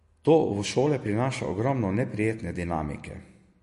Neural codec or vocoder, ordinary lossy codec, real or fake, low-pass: none; MP3, 48 kbps; real; 14.4 kHz